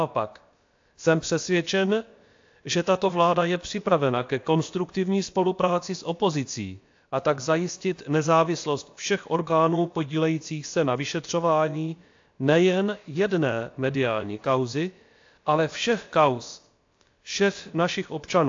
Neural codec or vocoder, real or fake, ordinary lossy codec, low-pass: codec, 16 kHz, about 1 kbps, DyCAST, with the encoder's durations; fake; AAC, 48 kbps; 7.2 kHz